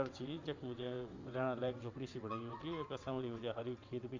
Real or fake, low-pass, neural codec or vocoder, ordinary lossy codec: fake; 7.2 kHz; vocoder, 22.05 kHz, 80 mel bands, WaveNeXt; MP3, 64 kbps